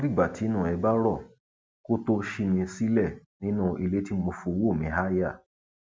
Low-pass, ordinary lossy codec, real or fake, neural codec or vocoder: none; none; real; none